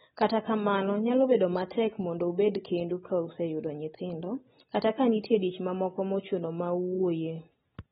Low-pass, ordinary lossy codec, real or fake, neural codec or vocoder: 9.9 kHz; AAC, 16 kbps; real; none